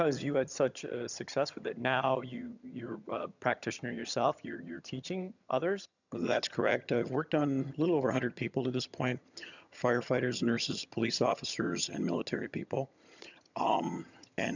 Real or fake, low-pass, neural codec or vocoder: fake; 7.2 kHz; vocoder, 22.05 kHz, 80 mel bands, HiFi-GAN